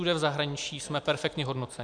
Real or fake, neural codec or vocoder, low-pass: real; none; 9.9 kHz